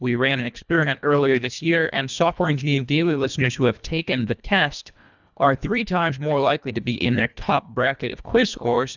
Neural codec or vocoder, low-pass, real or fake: codec, 24 kHz, 1.5 kbps, HILCodec; 7.2 kHz; fake